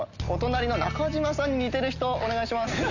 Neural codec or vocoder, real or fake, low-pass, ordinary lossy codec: none; real; 7.2 kHz; none